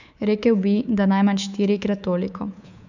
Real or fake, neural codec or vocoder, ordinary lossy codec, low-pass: fake; codec, 24 kHz, 3.1 kbps, DualCodec; none; 7.2 kHz